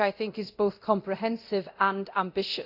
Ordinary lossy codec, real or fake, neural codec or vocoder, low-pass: none; fake; codec, 24 kHz, 0.9 kbps, DualCodec; 5.4 kHz